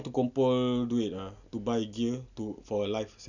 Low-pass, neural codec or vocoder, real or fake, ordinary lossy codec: 7.2 kHz; none; real; none